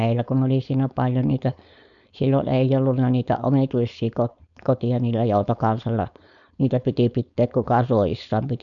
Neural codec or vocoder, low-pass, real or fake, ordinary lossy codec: codec, 16 kHz, 4.8 kbps, FACodec; 7.2 kHz; fake; none